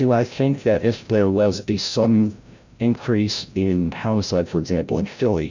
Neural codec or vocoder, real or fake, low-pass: codec, 16 kHz, 0.5 kbps, FreqCodec, larger model; fake; 7.2 kHz